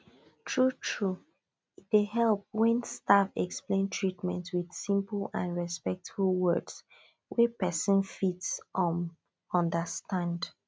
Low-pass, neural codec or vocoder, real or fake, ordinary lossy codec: none; none; real; none